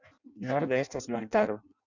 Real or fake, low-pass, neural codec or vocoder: fake; 7.2 kHz; codec, 16 kHz in and 24 kHz out, 0.6 kbps, FireRedTTS-2 codec